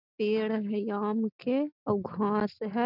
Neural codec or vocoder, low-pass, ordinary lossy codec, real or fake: none; 5.4 kHz; none; real